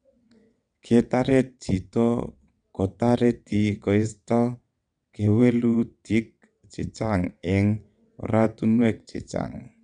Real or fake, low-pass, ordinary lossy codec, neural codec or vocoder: fake; 9.9 kHz; none; vocoder, 22.05 kHz, 80 mel bands, WaveNeXt